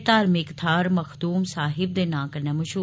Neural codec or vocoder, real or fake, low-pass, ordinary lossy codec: none; real; none; none